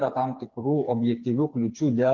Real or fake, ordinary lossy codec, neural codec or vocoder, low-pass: fake; Opus, 24 kbps; codec, 16 kHz, 4 kbps, FreqCodec, smaller model; 7.2 kHz